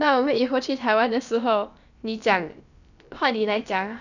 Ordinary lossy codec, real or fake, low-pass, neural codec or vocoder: none; fake; 7.2 kHz; codec, 16 kHz, about 1 kbps, DyCAST, with the encoder's durations